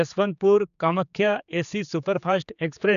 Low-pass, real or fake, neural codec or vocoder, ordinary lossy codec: 7.2 kHz; fake; codec, 16 kHz, 4 kbps, X-Codec, HuBERT features, trained on general audio; none